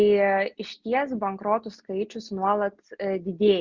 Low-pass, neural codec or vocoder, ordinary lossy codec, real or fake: 7.2 kHz; none; Opus, 64 kbps; real